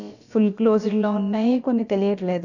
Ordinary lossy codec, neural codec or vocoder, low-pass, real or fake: none; codec, 16 kHz, about 1 kbps, DyCAST, with the encoder's durations; 7.2 kHz; fake